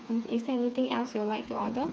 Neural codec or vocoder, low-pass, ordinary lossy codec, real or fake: codec, 16 kHz, 4 kbps, FreqCodec, smaller model; none; none; fake